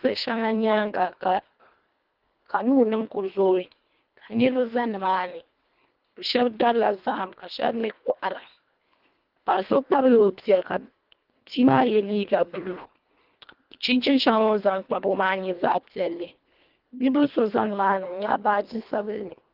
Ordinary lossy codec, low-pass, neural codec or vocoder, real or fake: Opus, 32 kbps; 5.4 kHz; codec, 24 kHz, 1.5 kbps, HILCodec; fake